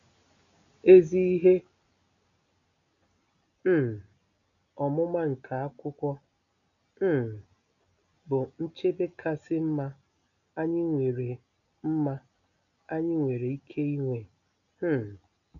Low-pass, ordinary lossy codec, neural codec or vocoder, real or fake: 7.2 kHz; none; none; real